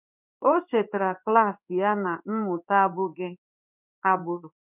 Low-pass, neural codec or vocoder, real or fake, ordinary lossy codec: 3.6 kHz; codec, 16 kHz in and 24 kHz out, 1 kbps, XY-Tokenizer; fake; none